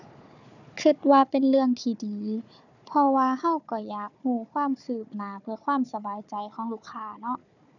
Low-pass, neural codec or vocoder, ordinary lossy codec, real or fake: 7.2 kHz; codec, 16 kHz, 4 kbps, FunCodec, trained on Chinese and English, 50 frames a second; none; fake